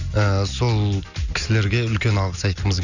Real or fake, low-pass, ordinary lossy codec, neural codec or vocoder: real; 7.2 kHz; none; none